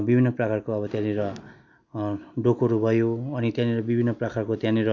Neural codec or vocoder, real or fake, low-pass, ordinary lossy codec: none; real; 7.2 kHz; none